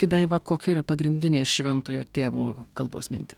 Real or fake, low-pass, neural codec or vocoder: fake; 19.8 kHz; codec, 44.1 kHz, 2.6 kbps, DAC